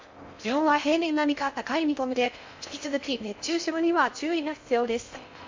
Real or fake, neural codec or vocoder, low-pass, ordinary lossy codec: fake; codec, 16 kHz in and 24 kHz out, 0.6 kbps, FocalCodec, streaming, 4096 codes; 7.2 kHz; MP3, 48 kbps